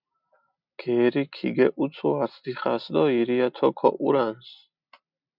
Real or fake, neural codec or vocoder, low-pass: real; none; 5.4 kHz